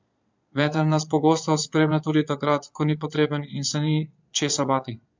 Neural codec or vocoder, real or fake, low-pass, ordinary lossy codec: vocoder, 22.05 kHz, 80 mel bands, Vocos; fake; 7.2 kHz; MP3, 64 kbps